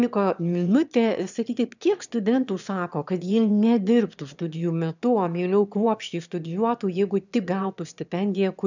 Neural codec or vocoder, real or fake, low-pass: autoencoder, 22.05 kHz, a latent of 192 numbers a frame, VITS, trained on one speaker; fake; 7.2 kHz